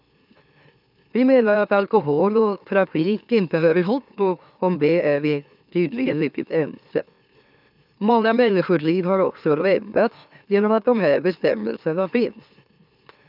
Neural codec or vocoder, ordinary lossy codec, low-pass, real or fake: autoencoder, 44.1 kHz, a latent of 192 numbers a frame, MeloTTS; none; 5.4 kHz; fake